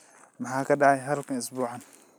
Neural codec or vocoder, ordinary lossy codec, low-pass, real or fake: none; none; none; real